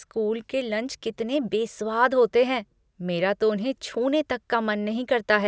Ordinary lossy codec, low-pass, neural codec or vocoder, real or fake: none; none; none; real